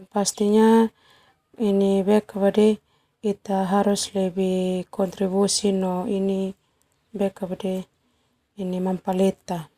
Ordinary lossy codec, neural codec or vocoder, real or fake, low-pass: Opus, 64 kbps; none; real; 14.4 kHz